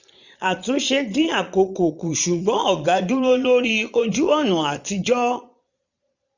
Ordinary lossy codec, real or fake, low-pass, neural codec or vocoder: none; fake; 7.2 kHz; vocoder, 22.05 kHz, 80 mel bands, Vocos